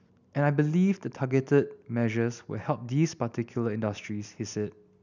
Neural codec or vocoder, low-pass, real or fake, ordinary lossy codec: none; 7.2 kHz; real; none